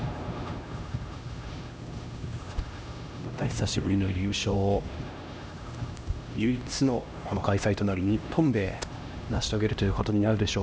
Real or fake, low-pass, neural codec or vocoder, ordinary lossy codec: fake; none; codec, 16 kHz, 1 kbps, X-Codec, HuBERT features, trained on LibriSpeech; none